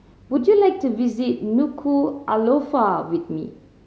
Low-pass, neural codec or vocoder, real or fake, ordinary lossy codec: none; none; real; none